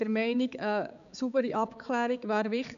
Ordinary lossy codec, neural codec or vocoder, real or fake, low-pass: none; codec, 16 kHz, 4 kbps, X-Codec, HuBERT features, trained on balanced general audio; fake; 7.2 kHz